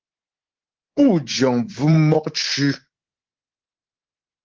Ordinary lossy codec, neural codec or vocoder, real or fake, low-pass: Opus, 16 kbps; codec, 24 kHz, 3.1 kbps, DualCodec; fake; 7.2 kHz